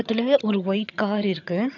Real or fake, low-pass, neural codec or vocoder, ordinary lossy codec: fake; 7.2 kHz; vocoder, 22.05 kHz, 80 mel bands, HiFi-GAN; none